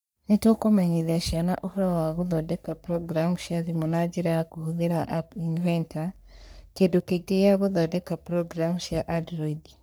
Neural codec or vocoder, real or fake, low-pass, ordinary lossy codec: codec, 44.1 kHz, 3.4 kbps, Pupu-Codec; fake; none; none